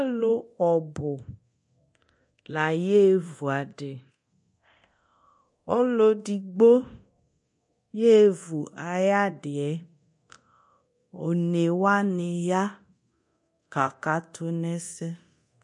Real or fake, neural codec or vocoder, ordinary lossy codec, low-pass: fake; codec, 24 kHz, 0.9 kbps, DualCodec; MP3, 48 kbps; 10.8 kHz